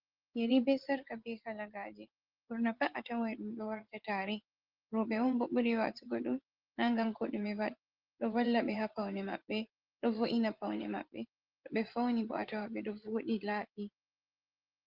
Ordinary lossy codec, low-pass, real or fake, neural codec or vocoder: Opus, 16 kbps; 5.4 kHz; fake; vocoder, 24 kHz, 100 mel bands, Vocos